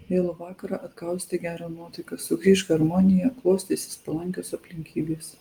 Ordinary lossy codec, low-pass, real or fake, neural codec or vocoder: Opus, 24 kbps; 19.8 kHz; real; none